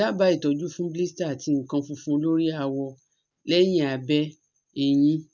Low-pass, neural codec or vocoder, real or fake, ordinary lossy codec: 7.2 kHz; none; real; none